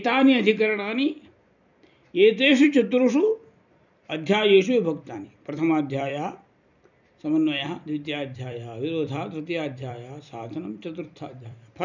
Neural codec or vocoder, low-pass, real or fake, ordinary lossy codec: vocoder, 44.1 kHz, 128 mel bands every 256 samples, BigVGAN v2; 7.2 kHz; fake; none